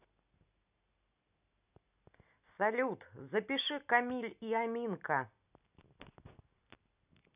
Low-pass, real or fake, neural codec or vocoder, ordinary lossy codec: 3.6 kHz; real; none; none